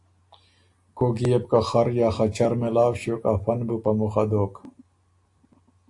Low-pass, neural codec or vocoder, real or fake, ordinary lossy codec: 10.8 kHz; none; real; AAC, 64 kbps